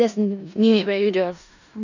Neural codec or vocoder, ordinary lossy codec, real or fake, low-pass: codec, 16 kHz in and 24 kHz out, 0.4 kbps, LongCat-Audio-Codec, four codebook decoder; none; fake; 7.2 kHz